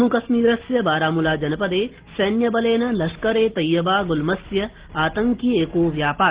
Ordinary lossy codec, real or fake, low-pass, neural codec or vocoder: Opus, 16 kbps; real; 3.6 kHz; none